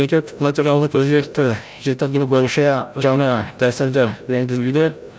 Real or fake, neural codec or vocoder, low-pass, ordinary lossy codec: fake; codec, 16 kHz, 0.5 kbps, FreqCodec, larger model; none; none